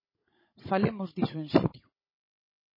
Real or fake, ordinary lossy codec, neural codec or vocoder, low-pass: fake; MP3, 24 kbps; codec, 16 kHz, 16 kbps, FunCodec, trained on Chinese and English, 50 frames a second; 5.4 kHz